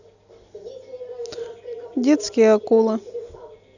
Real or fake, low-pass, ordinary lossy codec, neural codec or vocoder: real; 7.2 kHz; none; none